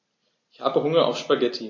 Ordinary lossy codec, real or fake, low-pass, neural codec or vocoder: MP3, 32 kbps; real; 7.2 kHz; none